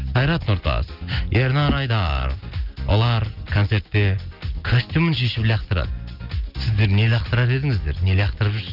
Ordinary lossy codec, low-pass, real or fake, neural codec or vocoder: Opus, 32 kbps; 5.4 kHz; real; none